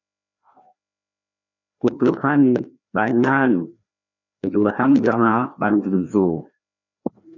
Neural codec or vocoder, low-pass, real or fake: codec, 16 kHz, 1 kbps, FreqCodec, larger model; 7.2 kHz; fake